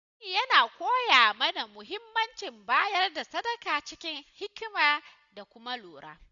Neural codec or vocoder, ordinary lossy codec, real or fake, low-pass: none; none; real; 7.2 kHz